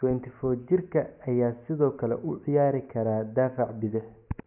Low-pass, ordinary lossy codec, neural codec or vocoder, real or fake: 3.6 kHz; none; none; real